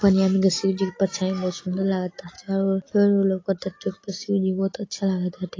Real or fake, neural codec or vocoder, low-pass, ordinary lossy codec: real; none; 7.2 kHz; AAC, 32 kbps